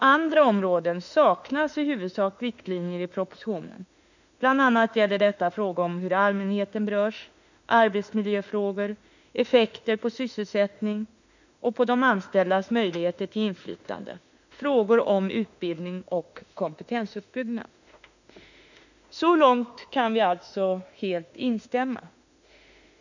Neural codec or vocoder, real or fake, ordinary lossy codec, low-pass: autoencoder, 48 kHz, 32 numbers a frame, DAC-VAE, trained on Japanese speech; fake; none; 7.2 kHz